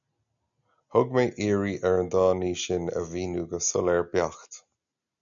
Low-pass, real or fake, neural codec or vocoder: 7.2 kHz; real; none